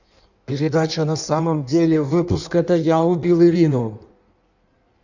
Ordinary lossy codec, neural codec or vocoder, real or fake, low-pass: none; codec, 16 kHz in and 24 kHz out, 1.1 kbps, FireRedTTS-2 codec; fake; 7.2 kHz